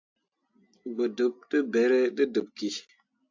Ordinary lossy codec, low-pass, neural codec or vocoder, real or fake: AAC, 48 kbps; 7.2 kHz; none; real